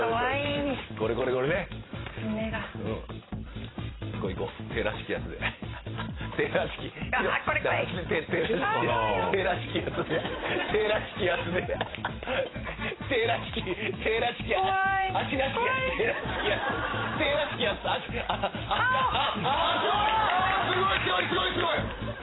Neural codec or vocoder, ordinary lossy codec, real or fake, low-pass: vocoder, 44.1 kHz, 128 mel bands every 512 samples, BigVGAN v2; AAC, 16 kbps; fake; 7.2 kHz